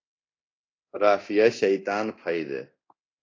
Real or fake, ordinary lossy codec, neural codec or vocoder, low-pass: fake; AAC, 32 kbps; codec, 24 kHz, 0.9 kbps, DualCodec; 7.2 kHz